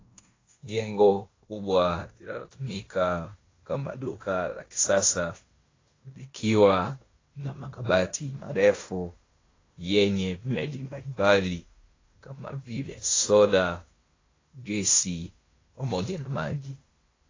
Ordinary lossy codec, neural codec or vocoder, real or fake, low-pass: AAC, 32 kbps; codec, 16 kHz in and 24 kHz out, 0.9 kbps, LongCat-Audio-Codec, fine tuned four codebook decoder; fake; 7.2 kHz